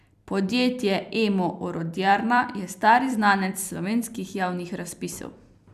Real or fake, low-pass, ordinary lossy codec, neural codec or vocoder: fake; 14.4 kHz; none; vocoder, 44.1 kHz, 128 mel bands every 256 samples, BigVGAN v2